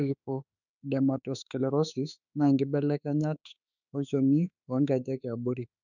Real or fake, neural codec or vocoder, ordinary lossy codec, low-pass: fake; autoencoder, 48 kHz, 32 numbers a frame, DAC-VAE, trained on Japanese speech; none; 7.2 kHz